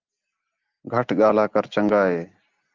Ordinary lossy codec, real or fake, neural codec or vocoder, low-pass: Opus, 32 kbps; real; none; 7.2 kHz